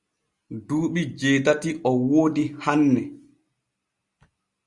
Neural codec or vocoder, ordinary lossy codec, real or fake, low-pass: none; Opus, 64 kbps; real; 10.8 kHz